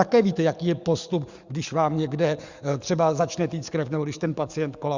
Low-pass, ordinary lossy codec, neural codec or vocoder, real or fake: 7.2 kHz; Opus, 64 kbps; codec, 44.1 kHz, 7.8 kbps, DAC; fake